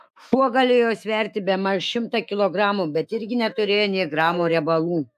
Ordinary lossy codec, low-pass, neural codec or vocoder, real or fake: AAC, 96 kbps; 14.4 kHz; autoencoder, 48 kHz, 128 numbers a frame, DAC-VAE, trained on Japanese speech; fake